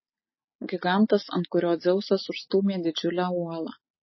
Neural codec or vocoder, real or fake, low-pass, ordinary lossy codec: none; real; 7.2 kHz; MP3, 24 kbps